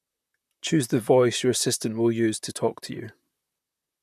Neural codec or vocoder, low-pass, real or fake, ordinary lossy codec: vocoder, 44.1 kHz, 128 mel bands, Pupu-Vocoder; 14.4 kHz; fake; none